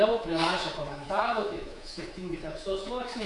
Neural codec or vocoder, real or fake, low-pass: codec, 24 kHz, 3.1 kbps, DualCodec; fake; 10.8 kHz